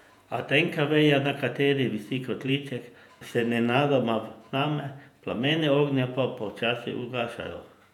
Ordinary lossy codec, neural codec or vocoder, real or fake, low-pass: none; vocoder, 48 kHz, 128 mel bands, Vocos; fake; 19.8 kHz